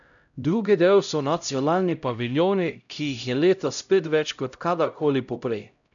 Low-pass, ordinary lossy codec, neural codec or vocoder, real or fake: 7.2 kHz; none; codec, 16 kHz, 0.5 kbps, X-Codec, HuBERT features, trained on LibriSpeech; fake